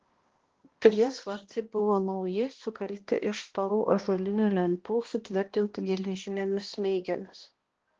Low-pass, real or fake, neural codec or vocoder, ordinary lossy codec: 7.2 kHz; fake; codec, 16 kHz, 1 kbps, X-Codec, HuBERT features, trained on balanced general audio; Opus, 16 kbps